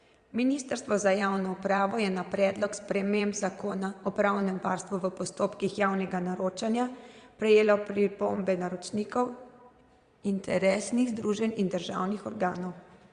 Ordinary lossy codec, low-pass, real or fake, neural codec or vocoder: Opus, 64 kbps; 9.9 kHz; fake; vocoder, 22.05 kHz, 80 mel bands, Vocos